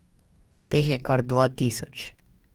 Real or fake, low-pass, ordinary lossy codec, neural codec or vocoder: fake; 19.8 kHz; Opus, 32 kbps; codec, 44.1 kHz, 2.6 kbps, DAC